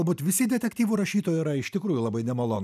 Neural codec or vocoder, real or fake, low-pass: none; real; 14.4 kHz